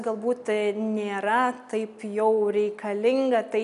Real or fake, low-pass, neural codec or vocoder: real; 10.8 kHz; none